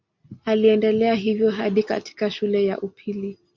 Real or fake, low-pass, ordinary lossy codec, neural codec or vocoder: real; 7.2 kHz; MP3, 48 kbps; none